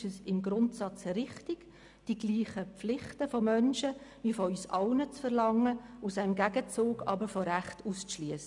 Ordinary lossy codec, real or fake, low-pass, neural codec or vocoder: none; real; 10.8 kHz; none